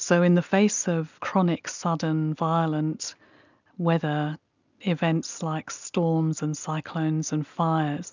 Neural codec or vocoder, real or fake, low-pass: none; real; 7.2 kHz